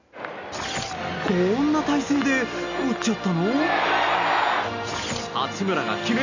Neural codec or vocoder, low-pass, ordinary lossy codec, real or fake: none; 7.2 kHz; none; real